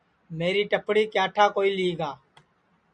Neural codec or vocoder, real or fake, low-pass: none; real; 9.9 kHz